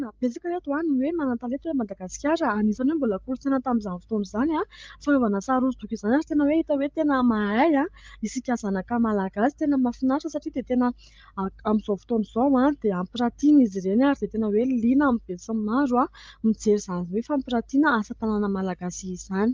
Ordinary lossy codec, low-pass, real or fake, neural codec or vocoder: Opus, 24 kbps; 7.2 kHz; fake; codec, 16 kHz, 16 kbps, FreqCodec, larger model